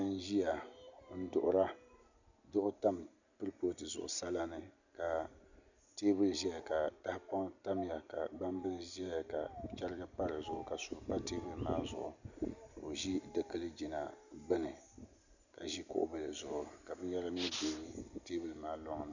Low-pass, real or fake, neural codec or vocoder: 7.2 kHz; real; none